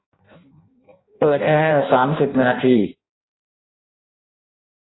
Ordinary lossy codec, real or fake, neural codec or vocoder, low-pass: AAC, 16 kbps; fake; codec, 16 kHz in and 24 kHz out, 0.6 kbps, FireRedTTS-2 codec; 7.2 kHz